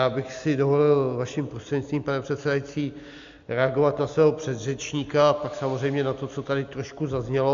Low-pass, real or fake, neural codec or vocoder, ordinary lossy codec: 7.2 kHz; real; none; AAC, 64 kbps